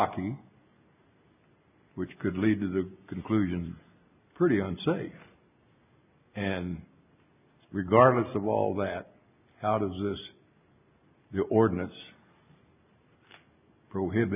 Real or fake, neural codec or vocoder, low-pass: real; none; 3.6 kHz